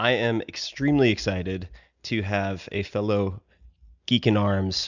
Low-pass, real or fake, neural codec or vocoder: 7.2 kHz; real; none